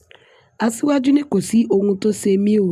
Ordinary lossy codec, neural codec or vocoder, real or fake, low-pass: AAC, 96 kbps; none; real; 14.4 kHz